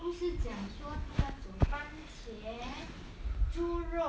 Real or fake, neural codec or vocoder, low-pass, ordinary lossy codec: real; none; none; none